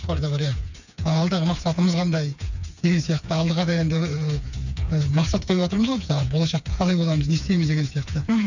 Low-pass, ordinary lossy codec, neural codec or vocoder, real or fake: 7.2 kHz; none; codec, 16 kHz, 4 kbps, FreqCodec, smaller model; fake